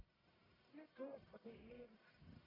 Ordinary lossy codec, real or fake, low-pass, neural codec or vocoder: none; fake; 5.4 kHz; codec, 44.1 kHz, 1.7 kbps, Pupu-Codec